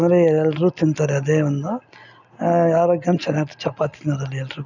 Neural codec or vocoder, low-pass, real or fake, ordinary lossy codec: none; 7.2 kHz; real; none